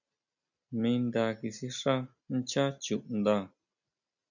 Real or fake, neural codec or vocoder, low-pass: real; none; 7.2 kHz